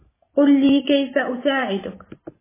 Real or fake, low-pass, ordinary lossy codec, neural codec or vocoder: fake; 3.6 kHz; MP3, 16 kbps; vocoder, 44.1 kHz, 128 mel bands every 512 samples, BigVGAN v2